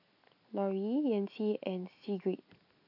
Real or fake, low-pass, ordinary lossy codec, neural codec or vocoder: real; 5.4 kHz; none; none